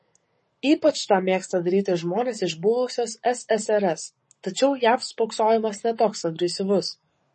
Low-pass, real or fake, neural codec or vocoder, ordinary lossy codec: 10.8 kHz; fake; codec, 44.1 kHz, 7.8 kbps, Pupu-Codec; MP3, 32 kbps